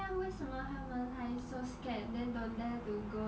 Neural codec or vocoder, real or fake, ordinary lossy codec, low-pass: none; real; none; none